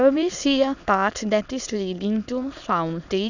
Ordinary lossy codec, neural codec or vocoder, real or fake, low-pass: none; autoencoder, 22.05 kHz, a latent of 192 numbers a frame, VITS, trained on many speakers; fake; 7.2 kHz